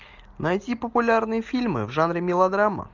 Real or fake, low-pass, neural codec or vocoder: real; 7.2 kHz; none